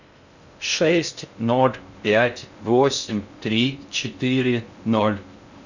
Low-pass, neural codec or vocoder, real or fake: 7.2 kHz; codec, 16 kHz in and 24 kHz out, 0.6 kbps, FocalCodec, streaming, 2048 codes; fake